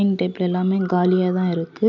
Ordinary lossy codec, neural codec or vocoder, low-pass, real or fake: none; none; 7.2 kHz; real